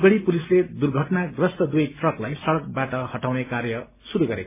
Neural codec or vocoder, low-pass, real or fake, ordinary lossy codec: none; 3.6 kHz; real; MP3, 16 kbps